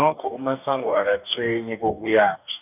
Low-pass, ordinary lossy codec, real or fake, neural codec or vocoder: 3.6 kHz; none; fake; codec, 44.1 kHz, 2.6 kbps, DAC